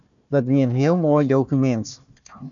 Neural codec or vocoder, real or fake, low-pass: codec, 16 kHz, 1 kbps, FunCodec, trained on Chinese and English, 50 frames a second; fake; 7.2 kHz